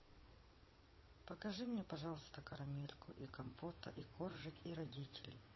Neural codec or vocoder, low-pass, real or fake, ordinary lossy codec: vocoder, 22.05 kHz, 80 mel bands, WaveNeXt; 7.2 kHz; fake; MP3, 24 kbps